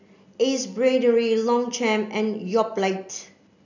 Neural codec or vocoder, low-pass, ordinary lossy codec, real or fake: none; 7.2 kHz; none; real